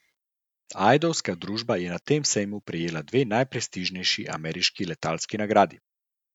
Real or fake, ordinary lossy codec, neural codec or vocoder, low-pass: real; none; none; 19.8 kHz